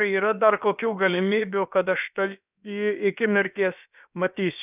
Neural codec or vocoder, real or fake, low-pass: codec, 16 kHz, about 1 kbps, DyCAST, with the encoder's durations; fake; 3.6 kHz